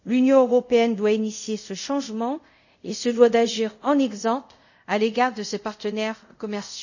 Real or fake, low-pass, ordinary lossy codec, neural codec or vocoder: fake; 7.2 kHz; none; codec, 24 kHz, 0.5 kbps, DualCodec